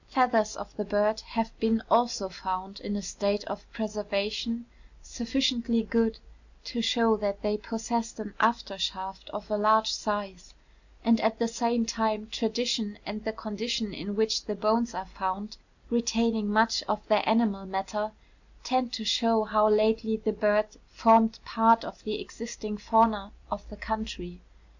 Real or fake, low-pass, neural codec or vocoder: real; 7.2 kHz; none